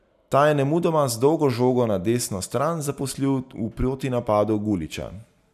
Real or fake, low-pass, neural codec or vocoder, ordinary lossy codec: real; 14.4 kHz; none; none